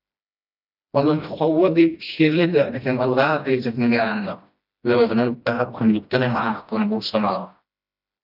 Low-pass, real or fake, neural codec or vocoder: 5.4 kHz; fake; codec, 16 kHz, 1 kbps, FreqCodec, smaller model